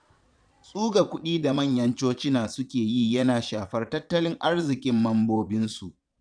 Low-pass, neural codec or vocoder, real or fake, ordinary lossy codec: 9.9 kHz; vocoder, 22.05 kHz, 80 mel bands, Vocos; fake; none